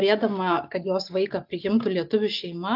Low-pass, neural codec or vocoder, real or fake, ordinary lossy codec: 5.4 kHz; none; real; AAC, 48 kbps